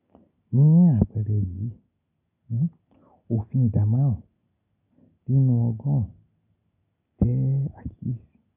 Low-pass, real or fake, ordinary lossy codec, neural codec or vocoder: 3.6 kHz; real; none; none